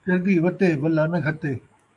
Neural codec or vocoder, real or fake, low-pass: codec, 44.1 kHz, 7.8 kbps, DAC; fake; 10.8 kHz